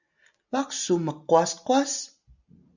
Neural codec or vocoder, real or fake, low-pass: none; real; 7.2 kHz